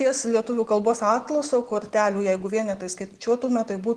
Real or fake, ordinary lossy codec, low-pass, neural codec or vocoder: real; Opus, 16 kbps; 10.8 kHz; none